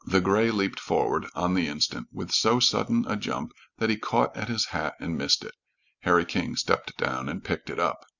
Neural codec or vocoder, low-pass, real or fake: none; 7.2 kHz; real